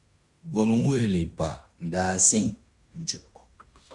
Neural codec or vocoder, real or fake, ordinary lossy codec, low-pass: codec, 16 kHz in and 24 kHz out, 0.9 kbps, LongCat-Audio-Codec, fine tuned four codebook decoder; fake; Opus, 64 kbps; 10.8 kHz